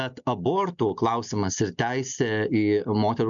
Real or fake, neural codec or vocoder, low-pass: real; none; 7.2 kHz